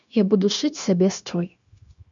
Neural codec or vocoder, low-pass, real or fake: codec, 16 kHz, 0.9 kbps, LongCat-Audio-Codec; 7.2 kHz; fake